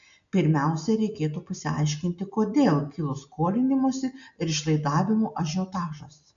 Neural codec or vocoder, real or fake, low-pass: none; real; 7.2 kHz